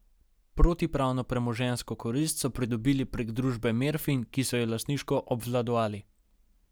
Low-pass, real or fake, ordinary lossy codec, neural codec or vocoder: none; real; none; none